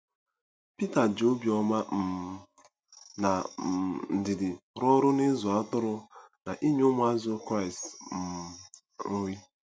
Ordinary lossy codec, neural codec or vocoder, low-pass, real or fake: none; none; none; real